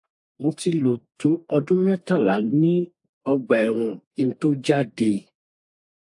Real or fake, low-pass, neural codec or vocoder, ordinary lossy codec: fake; 10.8 kHz; codec, 32 kHz, 1.9 kbps, SNAC; AAC, 64 kbps